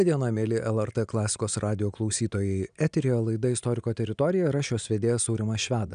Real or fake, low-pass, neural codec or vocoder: real; 9.9 kHz; none